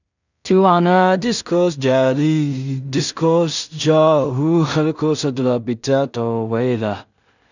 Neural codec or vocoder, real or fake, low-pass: codec, 16 kHz in and 24 kHz out, 0.4 kbps, LongCat-Audio-Codec, two codebook decoder; fake; 7.2 kHz